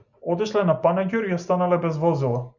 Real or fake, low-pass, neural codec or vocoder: real; 7.2 kHz; none